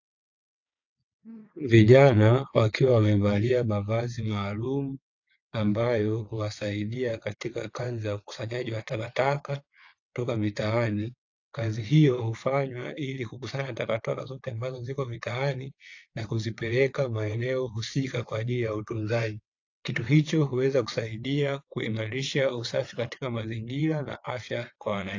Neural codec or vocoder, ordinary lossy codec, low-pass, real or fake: vocoder, 22.05 kHz, 80 mel bands, WaveNeXt; AAC, 48 kbps; 7.2 kHz; fake